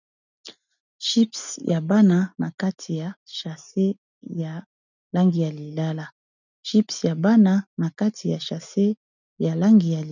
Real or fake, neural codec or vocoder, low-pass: real; none; 7.2 kHz